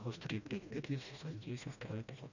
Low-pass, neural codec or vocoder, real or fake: 7.2 kHz; codec, 16 kHz, 1 kbps, FreqCodec, smaller model; fake